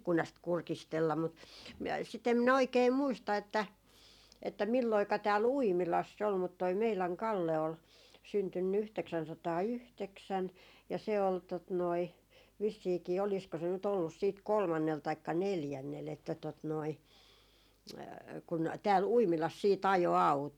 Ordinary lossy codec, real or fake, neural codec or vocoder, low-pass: none; real; none; 19.8 kHz